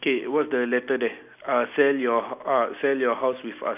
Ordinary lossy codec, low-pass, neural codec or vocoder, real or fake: none; 3.6 kHz; none; real